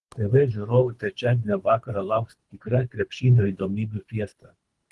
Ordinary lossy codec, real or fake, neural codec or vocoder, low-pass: Opus, 24 kbps; fake; codec, 24 kHz, 3 kbps, HILCodec; 10.8 kHz